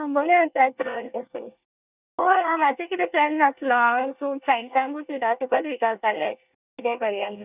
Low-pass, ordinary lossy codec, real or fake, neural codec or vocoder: 3.6 kHz; none; fake; codec, 24 kHz, 1 kbps, SNAC